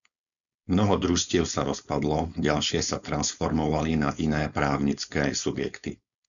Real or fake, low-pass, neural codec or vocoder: fake; 7.2 kHz; codec, 16 kHz, 4.8 kbps, FACodec